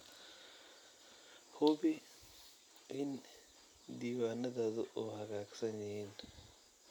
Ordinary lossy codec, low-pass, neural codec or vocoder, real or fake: none; none; none; real